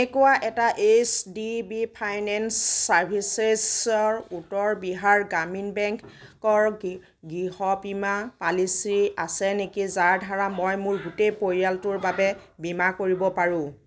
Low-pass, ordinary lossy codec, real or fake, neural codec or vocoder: none; none; real; none